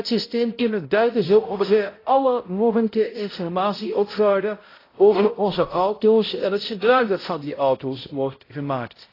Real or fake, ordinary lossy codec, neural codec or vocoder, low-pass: fake; AAC, 24 kbps; codec, 16 kHz, 0.5 kbps, X-Codec, HuBERT features, trained on balanced general audio; 5.4 kHz